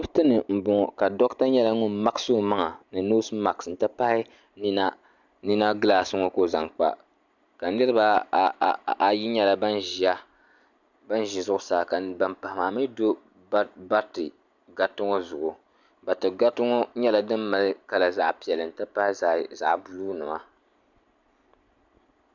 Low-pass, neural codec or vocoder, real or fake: 7.2 kHz; none; real